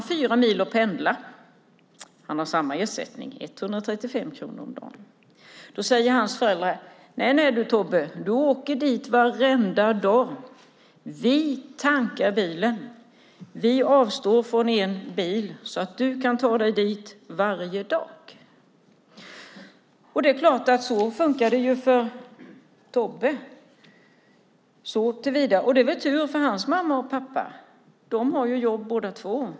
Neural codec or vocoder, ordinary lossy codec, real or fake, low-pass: none; none; real; none